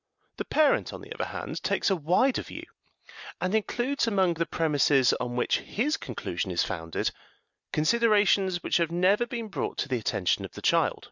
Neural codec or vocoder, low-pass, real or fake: none; 7.2 kHz; real